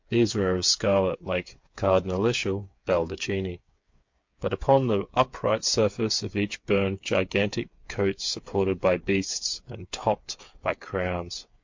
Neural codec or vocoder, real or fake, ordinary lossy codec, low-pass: codec, 16 kHz, 8 kbps, FreqCodec, smaller model; fake; MP3, 48 kbps; 7.2 kHz